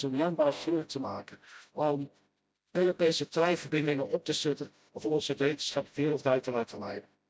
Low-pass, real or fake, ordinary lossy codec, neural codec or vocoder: none; fake; none; codec, 16 kHz, 0.5 kbps, FreqCodec, smaller model